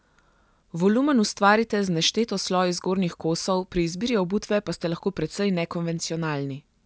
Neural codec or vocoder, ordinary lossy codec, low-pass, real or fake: none; none; none; real